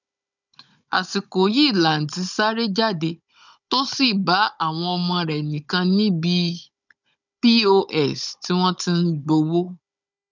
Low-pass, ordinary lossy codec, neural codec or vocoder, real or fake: 7.2 kHz; none; codec, 16 kHz, 16 kbps, FunCodec, trained on Chinese and English, 50 frames a second; fake